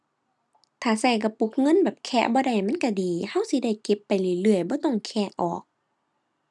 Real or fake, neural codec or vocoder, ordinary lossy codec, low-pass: real; none; none; none